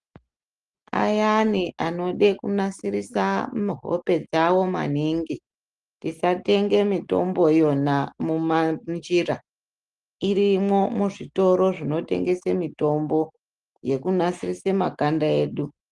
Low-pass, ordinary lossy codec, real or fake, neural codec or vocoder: 10.8 kHz; Opus, 24 kbps; real; none